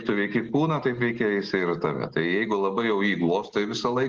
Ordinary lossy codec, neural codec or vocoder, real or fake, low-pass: Opus, 16 kbps; none; real; 7.2 kHz